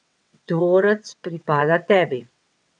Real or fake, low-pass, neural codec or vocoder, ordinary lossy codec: fake; 9.9 kHz; vocoder, 22.05 kHz, 80 mel bands, WaveNeXt; none